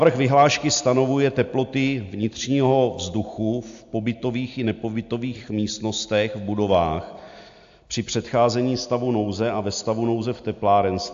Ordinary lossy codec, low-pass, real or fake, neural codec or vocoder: AAC, 64 kbps; 7.2 kHz; real; none